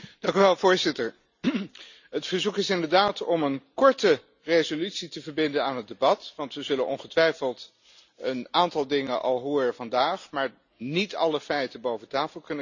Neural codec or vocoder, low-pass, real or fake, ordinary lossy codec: none; 7.2 kHz; real; none